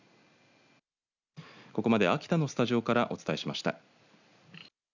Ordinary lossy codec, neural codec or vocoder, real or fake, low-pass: none; none; real; 7.2 kHz